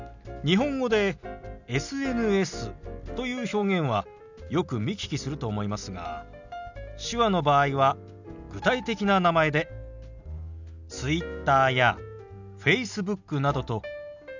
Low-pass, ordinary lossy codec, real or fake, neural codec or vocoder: 7.2 kHz; none; real; none